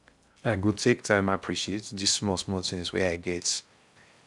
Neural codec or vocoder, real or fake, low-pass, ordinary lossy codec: codec, 16 kHz in and 24 kHz out, 0.6 kbps, FocalCodec, streaming, 2048 codes; fake; 10.8 kHz; none